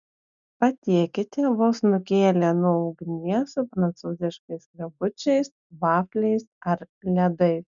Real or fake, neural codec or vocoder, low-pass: real; none; 7.2 kHz